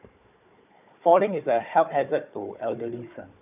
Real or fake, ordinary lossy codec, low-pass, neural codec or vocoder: fake; none; 3.6 kHz; codec, 16 kHz, 4 kbps, FunCodec, trained on Chinese and English, 50 frames a second